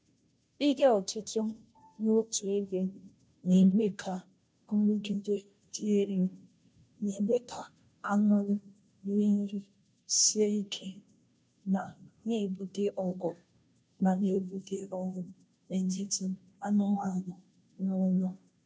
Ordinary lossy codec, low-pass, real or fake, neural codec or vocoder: none; none; fake; codec, 16 kHz, 0.5 kbps, FunCodec, trained on Chinese and English, 25 frames a second